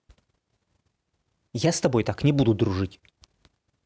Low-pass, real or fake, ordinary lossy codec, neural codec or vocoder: none; real; none; none